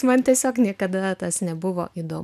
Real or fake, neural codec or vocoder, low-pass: real; none; 14.4 kHz